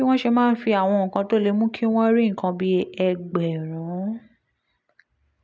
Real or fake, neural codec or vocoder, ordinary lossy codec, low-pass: real; none; none; none